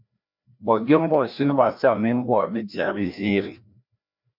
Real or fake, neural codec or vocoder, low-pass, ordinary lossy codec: fake; codec, 16 kHz, 1 kbps, FreqCodec, larger model; 5.4 kHz; MP3, 48 kbps